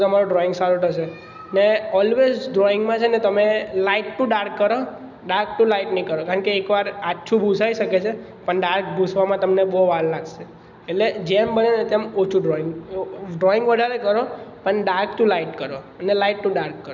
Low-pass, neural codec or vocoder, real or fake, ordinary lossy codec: 7.2 kHz; none; real; none